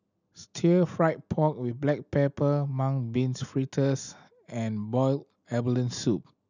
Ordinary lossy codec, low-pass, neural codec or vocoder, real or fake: none; 7.2 kHz; none; real